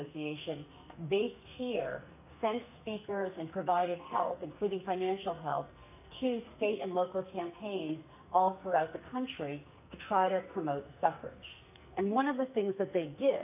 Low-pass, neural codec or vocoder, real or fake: 3.6 kHz; codec, 44.1 kHz, 2.6 kbps, SNAC; fake